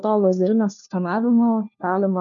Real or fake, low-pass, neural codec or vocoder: fake; 7.2 kHz; codec, 16 kHz, 1 kbps, FunCodec, trained on LibriTTS, 50 frames a second